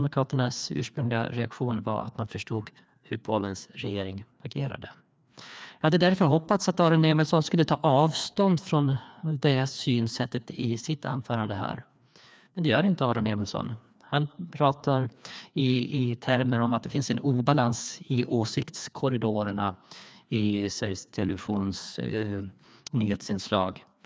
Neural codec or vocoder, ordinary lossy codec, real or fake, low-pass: codec, 16 kHz, 2 kbps, FreqCodec, larger model; none; fake; none